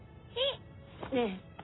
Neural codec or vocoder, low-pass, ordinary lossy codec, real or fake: none; 7.2 kHz; AAC, 16 kbps; real